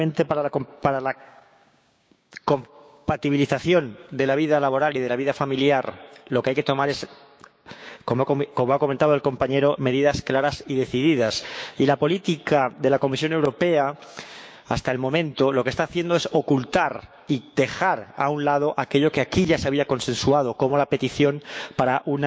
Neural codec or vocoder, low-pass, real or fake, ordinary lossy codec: codec, 16 kHz, 6 kbps, DAC; none; fake; none